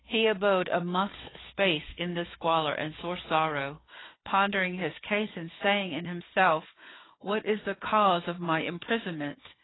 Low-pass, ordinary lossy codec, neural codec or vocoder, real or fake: 7.2 kHz; AAC, 16 kbps; codec, 16 kHz, 4 kbps, FunCodec, trained on Chinese and English, 50 frames a second; fake